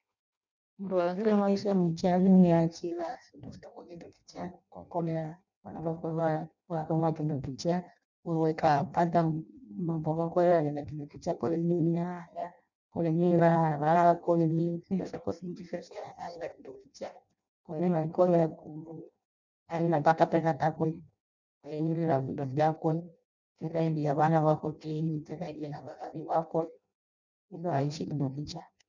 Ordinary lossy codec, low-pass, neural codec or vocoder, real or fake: none; 7.2 kHz; codec, 16 kHz in and 24 kHz out, 0.6 kbps, FireRedTTS-2 codec; fake